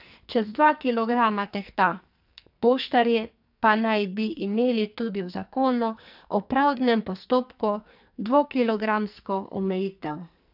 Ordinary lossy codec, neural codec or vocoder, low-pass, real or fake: AAC, 48 kbps; codec, 44.1 kHz, 2.6 kbps, SNAC; 5.4 kHz; fake